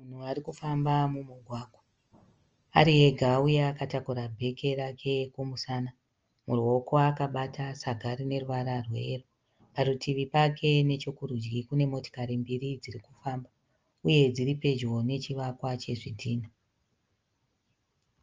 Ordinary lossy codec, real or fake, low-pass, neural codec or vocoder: Opus, 24 kbps; real; 7.2 kHz; none